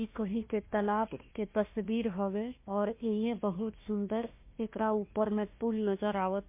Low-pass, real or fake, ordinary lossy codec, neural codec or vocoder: 3.6 kHz; fake; MP3, 24 kbps; codec, 16 kHz, 1 kbps, FunCodec, trained on Chinese and English, 50 frames a second